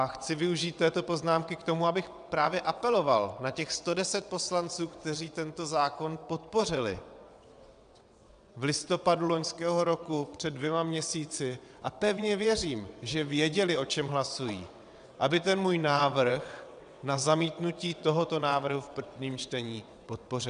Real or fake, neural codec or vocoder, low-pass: fake; vocoder, 22.05 kHz, 80 mel bands, WaveNeXt; 9.9 kHz